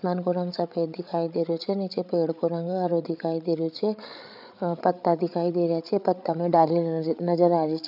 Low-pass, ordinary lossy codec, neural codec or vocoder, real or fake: 5.4 kHz; none; codec, 16 kHz, 8 kbps, FreqCodec, larger model; fake